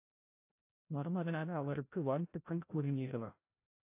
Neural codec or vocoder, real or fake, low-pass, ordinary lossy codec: codec, 16 kHz, 0.5 kbps, FreqCodec, larger model; fake; 3.6 kHz; AAC, 24 kbps